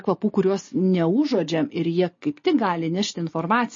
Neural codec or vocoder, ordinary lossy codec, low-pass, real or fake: none; MP3, 32 kbps; 7.2 kHz; real